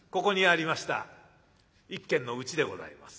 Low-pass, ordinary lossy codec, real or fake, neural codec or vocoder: none; none; real; none